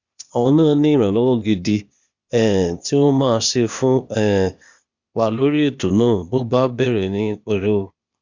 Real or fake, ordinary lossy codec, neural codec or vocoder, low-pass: fake; Opus, 64 kbps; codec, 16 kHz, 0.8 kbps, ZipCodec; 7.2 kHz